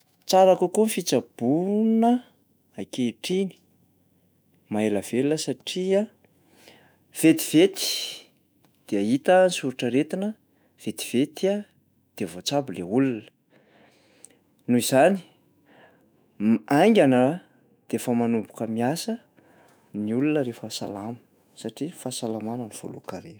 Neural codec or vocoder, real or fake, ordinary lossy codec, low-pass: autoencoder, 48 kHz, 128 numbers a frame, DAC-VAE, trained on Japanese speech; fake; none; none